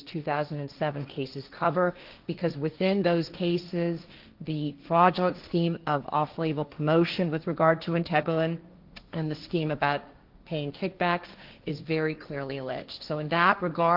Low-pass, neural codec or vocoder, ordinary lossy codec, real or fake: 5.4 kHz; codec, 16 kHz, 1.1 kbps, Voila-Tokenizer; Opus, 32 kbps; fake